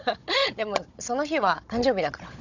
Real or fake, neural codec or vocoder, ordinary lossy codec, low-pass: fake; codec, 16 kHz, 16 kbps, FunCodec, trained on Chinese and English, 50 frames a second; none; 7.2 kHz